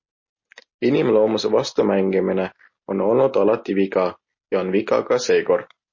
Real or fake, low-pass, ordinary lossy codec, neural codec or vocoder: real; 7.2 kHz; MP3, 32 kbps; none